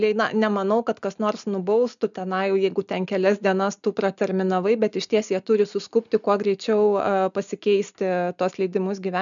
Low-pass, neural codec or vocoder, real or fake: 7.2 kHz; none; real